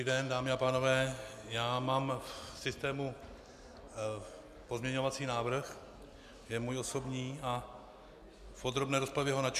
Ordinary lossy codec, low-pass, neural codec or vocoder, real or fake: MP3, 96 kbps; 14.4 kHz; none; real